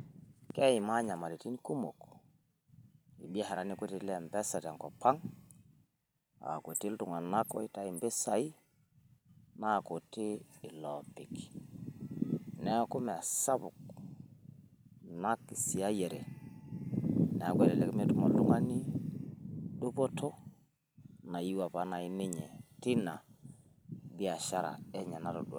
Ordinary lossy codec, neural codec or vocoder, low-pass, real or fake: none; vocoder, 44.1 kHz, 128 mel bands every 256 samples, BigVGAN v2; none; fake